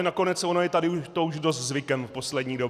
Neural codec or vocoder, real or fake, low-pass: none; real; 14.4 kHz